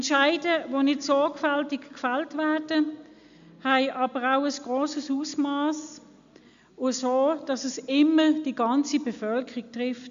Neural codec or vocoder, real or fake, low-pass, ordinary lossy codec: none; real; 7.2 kHz; MP3, 64 kbps